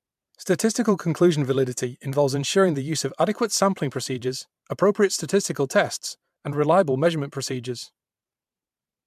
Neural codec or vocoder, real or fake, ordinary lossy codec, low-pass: vocoder, 44.1 kHz, 128 mel bands every 512 samples, BigVGAN v2; fake; MP3, 96 kbps; 14.4 kHz